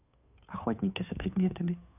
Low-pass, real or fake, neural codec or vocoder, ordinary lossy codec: 3.6 kHz; fake; codec, 16 kHz, 2 kbps, FunCodec, trained on Chinese and English, 25 frames a second; none